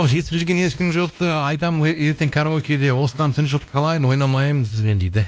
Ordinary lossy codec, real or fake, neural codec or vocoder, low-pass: none; fake; codec, 16 kHz, 1 kbps, X-Codec, WavLM features, trained on Multilingual LibriSpeech; none